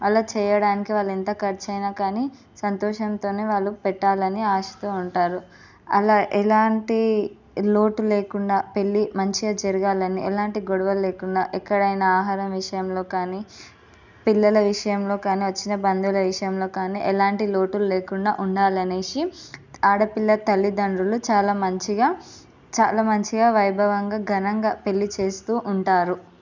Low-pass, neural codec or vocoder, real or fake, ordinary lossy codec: 7.2 kHz; none; real; none